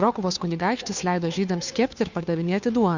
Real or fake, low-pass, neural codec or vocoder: fake; 7.2 kHz; codec, 16 kHz, 2 kbps, FunCodec, trained on Chinese and English, 25 frames a second